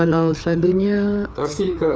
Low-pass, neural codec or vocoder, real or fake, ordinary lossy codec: none; codec, 16 kHz, 4 kbps, FreqCodec, larger model; fake; none